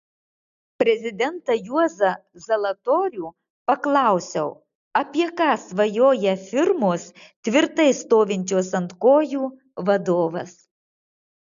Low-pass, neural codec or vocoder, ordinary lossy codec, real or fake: 7.2 kHz; none; AAC, 96 kbps; real